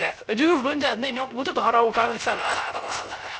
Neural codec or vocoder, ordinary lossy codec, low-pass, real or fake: codec, 16 kHz, 0.3 kbps, FocalCodec; none; none; fake